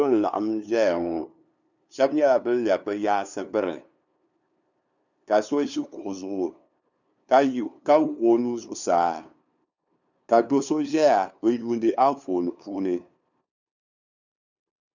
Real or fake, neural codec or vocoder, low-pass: fake; codec, 16 kHz, 2 kbps, FunCodec, trained on LibriTTS, 25 frames a second; 7.2 kHz